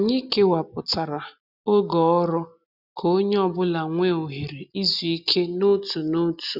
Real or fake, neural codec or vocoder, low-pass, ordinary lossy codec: real; none; 5.4 kHz; none